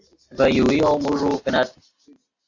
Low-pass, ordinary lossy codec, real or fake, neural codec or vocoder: 7.2 kHz; AAC, 48 kbps; real; none